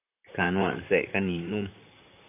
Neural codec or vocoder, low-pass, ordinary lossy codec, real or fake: vocoder, 44.1 kHz, 128 mel bands, Pupu-Vocoder; 3.6 kHz; AAC, 32 kbps; fake